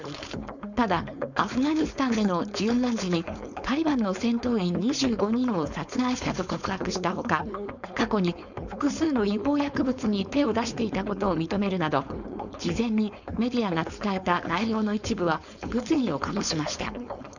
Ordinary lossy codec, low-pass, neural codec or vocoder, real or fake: none; 7.2 kHz; codec, 16 kHz, 4.8 kbps, FACodec; fake